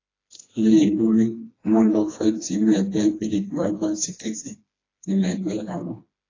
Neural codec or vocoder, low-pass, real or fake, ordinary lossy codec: codec, 16 kHz, 2 kbps, FreqCodec, smaller model; 7.2 kHz; fake; AAC, 32 kbps